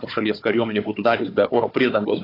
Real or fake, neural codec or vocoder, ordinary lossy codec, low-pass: fake; codec, 16 kHz, 4 kbps, FunCodec, trained on Chinese and English, 50 frames a second; AAC, 24 kbps; 5.4 kHz